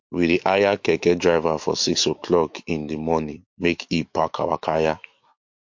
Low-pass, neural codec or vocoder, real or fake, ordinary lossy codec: 7.2 kHz; autoencoder, 48 kHz, 128 numbers a frame, DAC-VAE, trained on Japanese speech; fake; MP3, 48 kbps